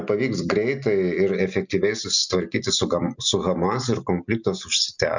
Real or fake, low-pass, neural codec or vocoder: real; 7.2 kHz; none